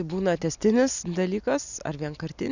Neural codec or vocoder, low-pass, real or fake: none; 7.2 kHz; real